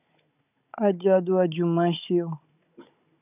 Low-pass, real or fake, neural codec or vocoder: 3.6 kHz; fake; codec, 16 kHz, 16 kbps, FunCodec, trained on Chinese and English, 50 frames a second